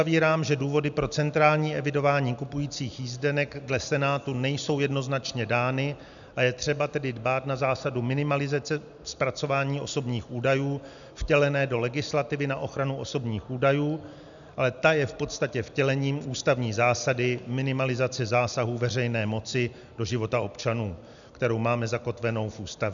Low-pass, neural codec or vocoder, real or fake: 7.2 kHz; none; real